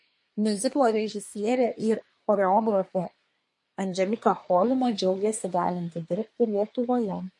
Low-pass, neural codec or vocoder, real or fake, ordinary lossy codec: 10.8 kHz; codec, 24 kHz, 1 kbps, SNAC; fake; MP3, 48 kbps